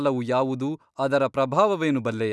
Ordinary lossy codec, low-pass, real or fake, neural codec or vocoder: none; none; real; none